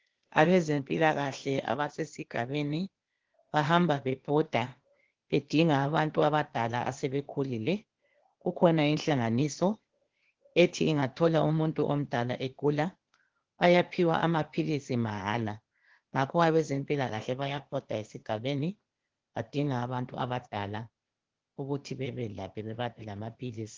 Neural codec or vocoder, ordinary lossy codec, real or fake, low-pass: codec, 16 kHz, 0.8 kbps, ZipCodec; Opus, 16 kbps; fake; 7.2 kHz